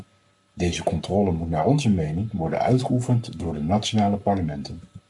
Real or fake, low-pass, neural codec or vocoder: fake; 10.8 kHz; codec, 44.1 kHz, 7.8 kbps, Pupu-Codec